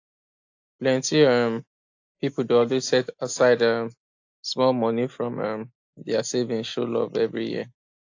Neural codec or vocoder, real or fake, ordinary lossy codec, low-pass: none; real; AAC, 48 kbps; 7.2 kHz